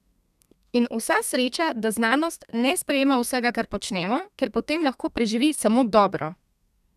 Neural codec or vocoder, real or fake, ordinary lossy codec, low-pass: codec, 32 kHz, 1.9 kbps, SNAC; fake; none; 14.4 kHz